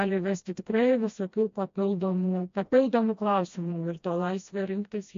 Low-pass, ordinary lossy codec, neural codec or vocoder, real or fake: 7.2 kHz; MP3, 48 kbps; codec, 16 kHz, 1 kbps, FreqCodec, smaller model; fake